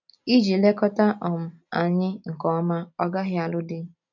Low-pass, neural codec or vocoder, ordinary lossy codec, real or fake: 7.2 kHz; none; MP3, 64 kbps; real